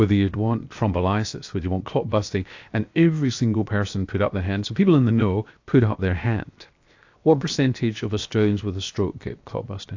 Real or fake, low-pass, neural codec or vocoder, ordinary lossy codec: fake; 7.2 kHz; codec, 16 kHz, 0.7 kbps, FocalCodec; AAC, 48 kbps